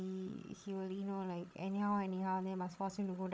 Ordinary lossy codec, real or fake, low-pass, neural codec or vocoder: none; fake; none; codec, 16 kHz, 16 kbps, FreqCodec, larger model